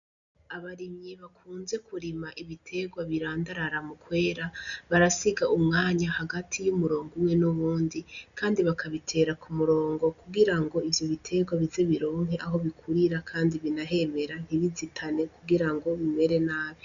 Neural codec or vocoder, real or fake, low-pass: none; real; 7.2 kHz